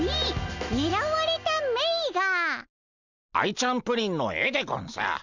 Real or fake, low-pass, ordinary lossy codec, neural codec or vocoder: real; 7.2 kHz; Opus, 64 kbps; none